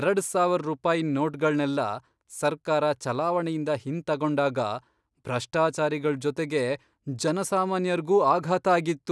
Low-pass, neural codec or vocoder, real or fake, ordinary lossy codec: none; none; real; none